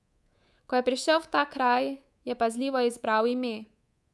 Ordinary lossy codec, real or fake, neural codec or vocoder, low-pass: none; fake; codec, 24 kHz, 3.1 kbps, DualCodec; none